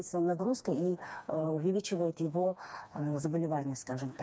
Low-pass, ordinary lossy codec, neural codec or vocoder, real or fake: none; none; codec, 16 kHz, 2 kbps, FreqCodec, smaller model; fake